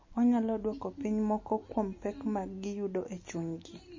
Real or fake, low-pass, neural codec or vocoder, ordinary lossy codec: real; 7.2 kHz; none; MP3, 32 kbps